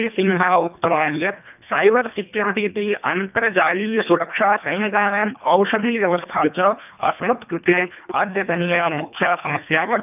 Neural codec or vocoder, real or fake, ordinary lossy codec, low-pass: codec, 24 kHz, 1.5 kbps, HILCodec; fake; none; 3.6 kHz